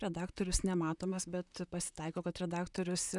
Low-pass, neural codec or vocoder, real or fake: 10.8 kHz; vocoder, 44.1 kHz, 128 mel bands, Pupu-Vocoder; fake